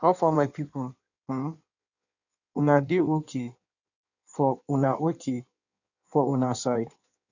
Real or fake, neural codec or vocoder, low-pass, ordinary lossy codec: fake; codec, 16 kHz in and 24 kHz out, 1.1 kbps, FireRedTTS-2 codec; 7.2 kHz; none